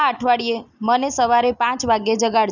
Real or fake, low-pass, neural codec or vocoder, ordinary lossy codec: real; 7.2 kHz; none; none